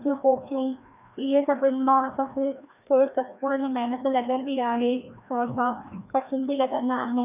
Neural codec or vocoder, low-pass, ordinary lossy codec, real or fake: codec, 16 kHz, 1 kbps, FreqCodec, larger model; 3.6 kHz; none; fake